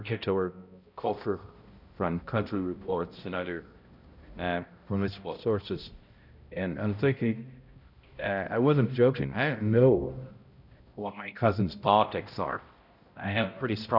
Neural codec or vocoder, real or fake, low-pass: codec, 16 kHz, 0.5 kbps, X-Codec, HuBERT features, trained on balanced general audio; fake; 5.4 kHz